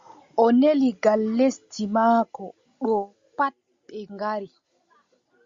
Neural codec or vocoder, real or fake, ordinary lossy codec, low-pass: none; real; Opus, 64 kbps; 7.2 kHz